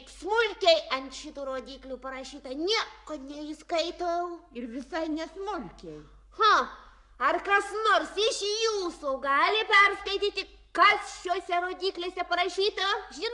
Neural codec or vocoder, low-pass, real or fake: codec, 44.1 kHz, 7.8 kbps, Pupu-Codec; 10.8 kHz; fake